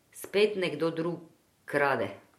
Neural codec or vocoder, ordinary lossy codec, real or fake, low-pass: none; MP3, 64 kbps; real; 19.8 kHz